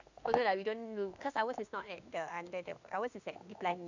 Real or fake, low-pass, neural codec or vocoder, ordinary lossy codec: fake; 7.2 kHz; autoencoder, 48 kHz, 32 numbers a frame, DAC-VAE, trained on Japanese speech; none